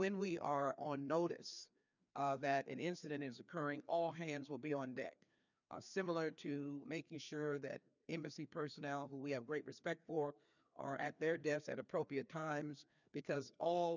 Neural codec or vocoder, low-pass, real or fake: codec, 16 kHz, 2 kbps, FreqCodec, larger model; 7.2 kHz; fake